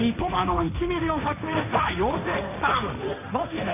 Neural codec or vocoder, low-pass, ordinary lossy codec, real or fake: codec, 16 kHz, 1.1 kbps, Voila-Tokenizer; 3.6 kHz; none; fake